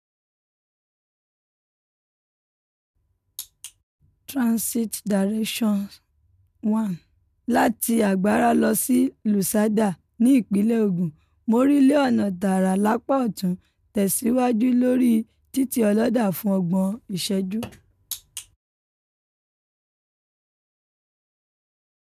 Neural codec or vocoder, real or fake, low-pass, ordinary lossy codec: none; real; 14.4 kHz; none